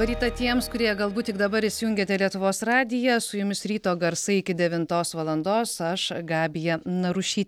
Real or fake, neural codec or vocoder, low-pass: real; none; 19.8 kHz